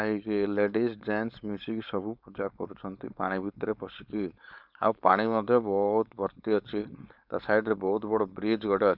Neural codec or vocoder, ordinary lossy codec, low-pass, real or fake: codec, 16 kHz, 4.8 kbps, FACodec; none; 5.4 kHz; fake